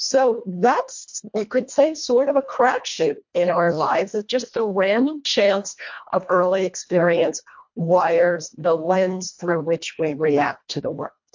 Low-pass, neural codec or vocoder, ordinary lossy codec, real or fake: 7.2 kHz; codec, 24 kHz, 1.5 kbps, HILCodec; MP3, 48 kbps; fake